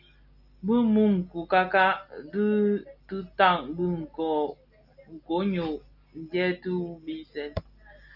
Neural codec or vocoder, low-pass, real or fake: none; 5.4 kHz; real